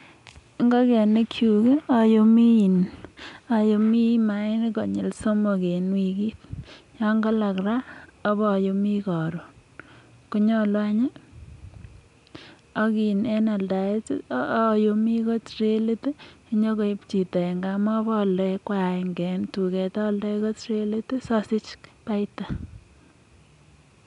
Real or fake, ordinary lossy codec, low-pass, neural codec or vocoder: real; none; 10.8 kHz; none